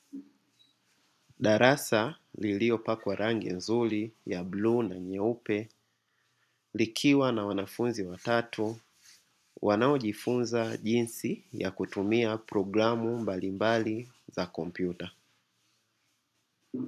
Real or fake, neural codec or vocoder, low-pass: real; none; 14.4 kHz